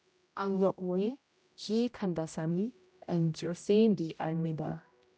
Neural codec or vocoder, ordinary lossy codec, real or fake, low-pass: codec, 16 kHz, 0.5 kbps, X-Codec, HuBERT features, trained on general audio; none; fake; none